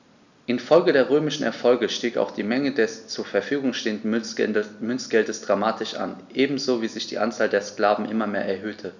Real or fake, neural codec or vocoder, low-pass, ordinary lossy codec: real; none; 7.2 kHz; none